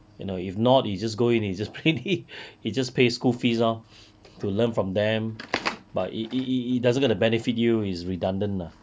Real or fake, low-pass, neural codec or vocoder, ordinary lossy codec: real; none; none; none